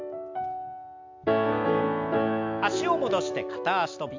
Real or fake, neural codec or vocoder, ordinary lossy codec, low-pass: real; none; MP3, 64 kbps; 7.2 kHz